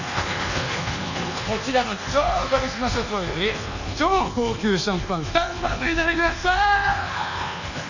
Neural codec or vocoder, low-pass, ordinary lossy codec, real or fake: codec, 24 kHz, 1.2 kbps, DualCodec; 7.2 kHz; none; fake